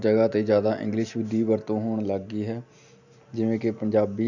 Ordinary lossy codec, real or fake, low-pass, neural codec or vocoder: none; real; 7.2 kHz; none